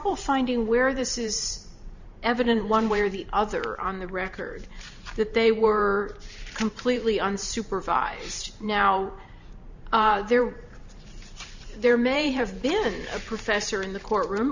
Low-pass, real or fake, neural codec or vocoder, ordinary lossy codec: 7.2 kHz; fake; vocoder, 44.1 kHz, 128 mel bands every 512 samples, BigVGAN v2; Opus, 64 kbps